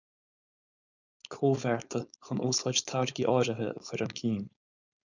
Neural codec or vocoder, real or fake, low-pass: codec, 16 kHz, 4.8 kbps, FACodec; fake; 7.2 kHz